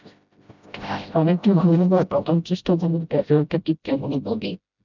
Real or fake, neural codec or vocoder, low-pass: fake; codec, 16 kHz, 0.5 kbps, FreqCodec, smaller model; 7.2 kHz